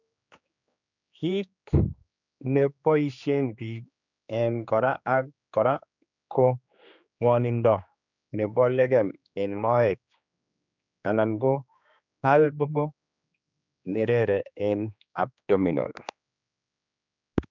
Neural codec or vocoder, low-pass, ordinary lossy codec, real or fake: codec, 16 kHz, 2 kbps, X-Codec, HuBERT features, trained on general audio; 7.2 kHz; none; fake